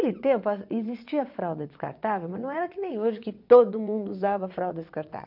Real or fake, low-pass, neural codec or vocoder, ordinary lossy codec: fake; 5.4 kHz; vocoder, 22.05 kHz, 80 mel bands, WaveNeXt; none